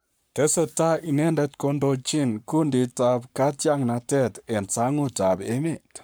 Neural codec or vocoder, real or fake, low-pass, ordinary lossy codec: codec, 44.1 kHz, 7.8 kbps, Pupu-Codec; fake; none; none